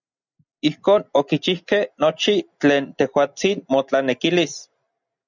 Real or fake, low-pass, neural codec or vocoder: real; 7.2 kHz; none